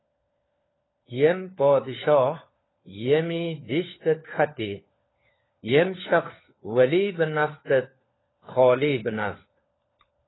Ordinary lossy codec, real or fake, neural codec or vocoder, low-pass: AAC, 16 kbps; fake; codec, 16 kHz, 4 kbps, FunCodec, trained on LibriTTS, 50 frames a second; 7.2 kHz